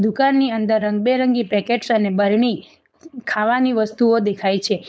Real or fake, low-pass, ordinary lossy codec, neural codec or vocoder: fake; none; none; codec, 16 kHz, 4.8 kbps, FACodec